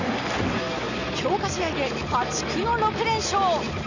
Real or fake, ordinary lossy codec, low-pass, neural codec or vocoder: real; none; 7.2 kHz; none